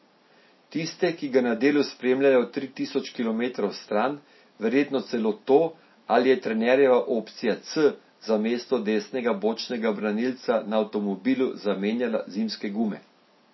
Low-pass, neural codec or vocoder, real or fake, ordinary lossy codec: 7.2 kHz; none; real; MP3, 24 kbps